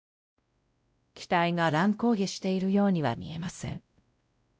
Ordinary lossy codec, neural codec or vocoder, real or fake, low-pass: none; codec, 16 kHz, 0.5 kbps, X-Codec, WavLM features, trained on Multilingual LibriSpeech; fake; none